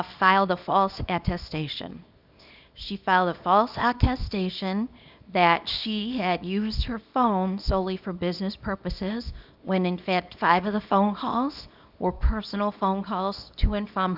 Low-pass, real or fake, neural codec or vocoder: 5.4 kHz; fake; codec, 24 kHz, 0.9 kbps, WavTokenizer, small release